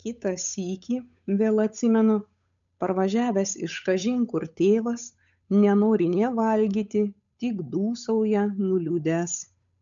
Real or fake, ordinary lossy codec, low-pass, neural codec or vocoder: fake; MP3, 96 kbps; 7.2 kHz; codec, 16 kHz, 8 kbps, FunCodec, trained on Chinese and English, 25 frames a second